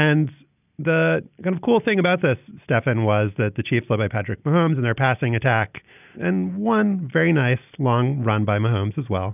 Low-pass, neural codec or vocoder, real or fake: 3.6 kHz; none; real